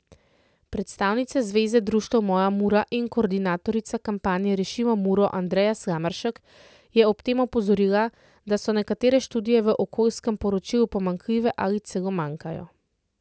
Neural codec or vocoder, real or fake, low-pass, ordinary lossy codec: none; real; none; none